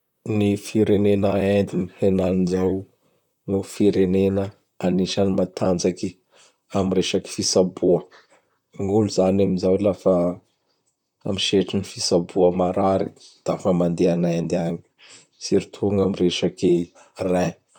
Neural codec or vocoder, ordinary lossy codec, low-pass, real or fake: vocoder, 44.1 kHz, 128 mel bands, Pupu-Vocoder; none; 19.8 kHz; fake